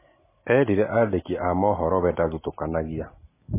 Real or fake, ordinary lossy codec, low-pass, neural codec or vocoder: real; MP3, 16 kbps; 3.6 kHz; none